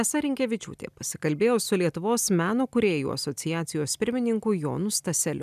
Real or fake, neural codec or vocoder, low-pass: real; none; 14.4 kHz